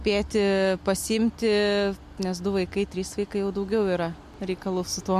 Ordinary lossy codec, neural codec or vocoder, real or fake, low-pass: MP3, 64 kbps; none; real; 14.4 kHz